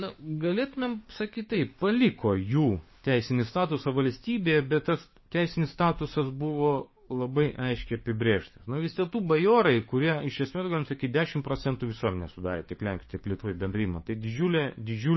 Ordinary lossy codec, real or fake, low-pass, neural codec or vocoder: MP3, 24 kbps; fake; 7.2 kHz; codec, 16 kHz, 6 kbps, DAC